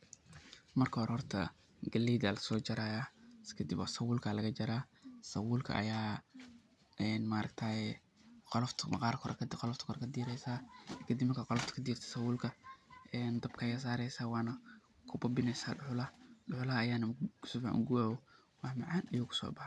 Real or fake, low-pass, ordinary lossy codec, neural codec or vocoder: real; none; none; none